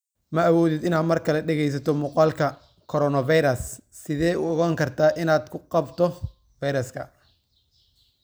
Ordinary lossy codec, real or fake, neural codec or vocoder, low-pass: none; real; none; none